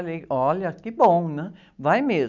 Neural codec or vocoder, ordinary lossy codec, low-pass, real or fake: none; none; 7.2 kHz; real